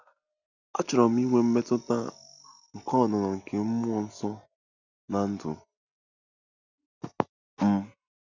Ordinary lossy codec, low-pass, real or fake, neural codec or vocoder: none; 7.2 kHz; real; none